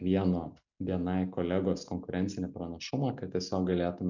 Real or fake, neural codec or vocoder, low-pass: real; none; 7.2 kHz